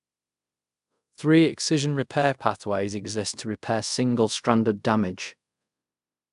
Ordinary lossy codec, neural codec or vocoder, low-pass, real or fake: AAC, 96 kbps; codec, 24 kHz, 0.5 kbps, DualCodec; 10.8 kHz; fake